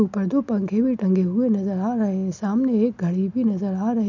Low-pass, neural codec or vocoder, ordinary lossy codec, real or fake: 7.2 kHz; none; none; real